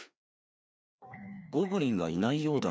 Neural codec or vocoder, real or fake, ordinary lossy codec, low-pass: codec, 16 kHz, 2 kbps, FreqCodec, larger model; fake; none; none